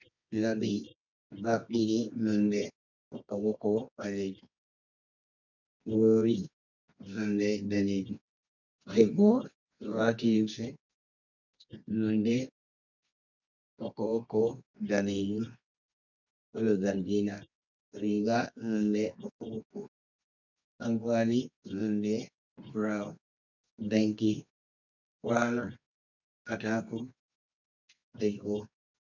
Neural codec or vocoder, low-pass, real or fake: codec, 24 kHz, 0.9 kbps, WavTokenizer, medium music audio release; 7.2 kHz; fake